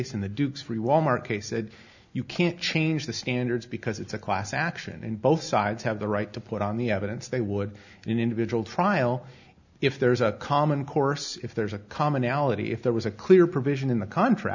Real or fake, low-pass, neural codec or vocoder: real; 7.2 kHz; none